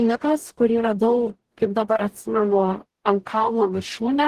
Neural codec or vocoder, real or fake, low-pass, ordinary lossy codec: codec, 44.1 kHz, 0.9 kbps, DAC; fake; 14.4 kHz; Opus, 16 kbps